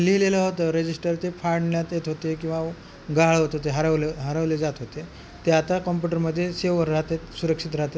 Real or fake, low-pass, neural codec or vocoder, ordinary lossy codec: real; none; none; none